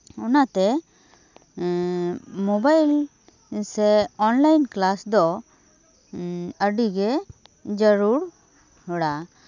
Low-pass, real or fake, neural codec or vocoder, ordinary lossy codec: 7.2 kHz; real; none; none